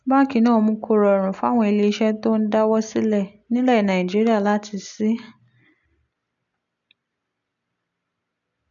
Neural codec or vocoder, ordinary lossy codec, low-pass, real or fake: none; none; 7.2 kHz; real